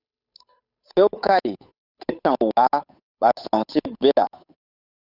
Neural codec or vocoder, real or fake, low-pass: codec, 16 kHz, 8 kbps, FunCodec, trained on Chinese and English, 25 frames a second; fake; 5.4 kHz